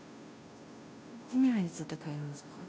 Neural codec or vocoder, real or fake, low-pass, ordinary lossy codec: codec, 16 kHz, 0.5 kbps, FunCodec, trained on Chinese and English, 25 frames a second; fake; none; none